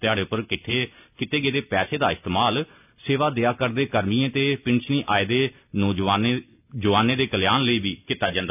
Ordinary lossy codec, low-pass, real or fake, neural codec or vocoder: none; 3.6 kHz; real; none